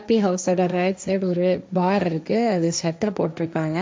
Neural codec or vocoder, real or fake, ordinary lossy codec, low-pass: codec, 16 kHz, 1.1 kbps, Voila-Tokenizer; fake; none; none